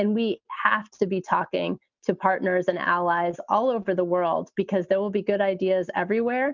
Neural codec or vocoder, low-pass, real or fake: none; 7.2 kHz; real